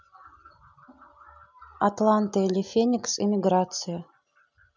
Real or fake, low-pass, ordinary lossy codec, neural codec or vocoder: real; 7.2 kHz; none; none